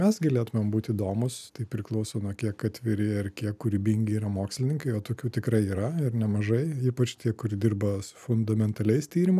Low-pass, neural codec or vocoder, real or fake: 14.4 kHz; none; real